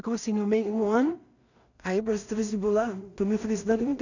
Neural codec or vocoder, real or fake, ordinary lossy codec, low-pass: codec, 16 kHz in and 24 kHz out, 0.4 kbps, LongCat-Audio-Codec, two codebook decoder; fake; none; 7.2 kHz